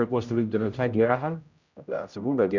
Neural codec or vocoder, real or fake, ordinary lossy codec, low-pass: codec, 16 kHz, 0.5 kbps, X-Codec, HuBERT features, trained on general audio; fake; none; 7.2 kHz